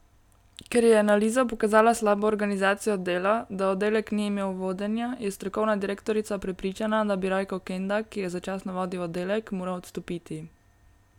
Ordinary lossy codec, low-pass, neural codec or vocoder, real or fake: none; 19.8 kHz; none; real